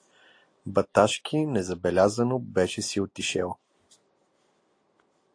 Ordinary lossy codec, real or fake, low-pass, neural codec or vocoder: AAC, 48 kbps; real; 9.9 kHz; none